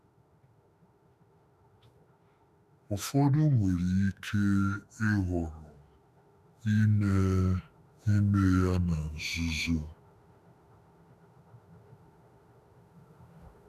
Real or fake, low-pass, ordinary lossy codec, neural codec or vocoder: fake; 14.4 kHz; none; autoencoder, 48 kHz, 32 numbers a frame, DAC-VAE, trained on Japanese speech